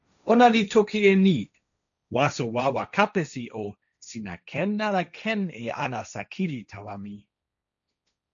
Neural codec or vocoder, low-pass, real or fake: codec, 16 kHz, 1.1 kbps, Voila-Tokenizer; 7.2 kHz; fake